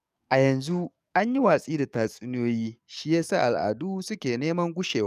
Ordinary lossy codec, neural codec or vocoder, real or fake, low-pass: none; codec, 44.1 kHz, 7.8 kbps, DAC; fake; 14.4 kHz